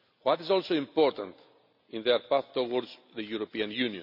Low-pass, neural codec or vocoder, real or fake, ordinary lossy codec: 5.4 kHz; none; real; none